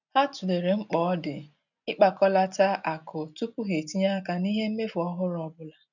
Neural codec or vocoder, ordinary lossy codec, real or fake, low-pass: none; none; real; 7.2 kHz